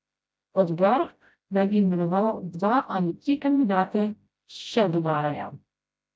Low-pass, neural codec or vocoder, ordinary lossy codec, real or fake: none; codec, 16 kHz, 0.5 kbps, FreqCodec, smaller model; none; fake